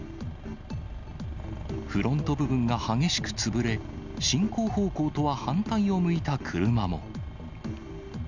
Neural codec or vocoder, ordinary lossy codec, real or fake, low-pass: none; none; real; 7.2 kHz